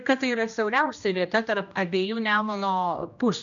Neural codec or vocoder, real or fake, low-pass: codec, 16 kHz, 1 kbps, X-Codec, HuBERT features, trained on general audio; fake; 7.2 kHz